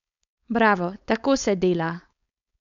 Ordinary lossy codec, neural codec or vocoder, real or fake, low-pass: none; codec, 16 kHz, 4.8 kbps, FACodec; fake; 7.2 kHz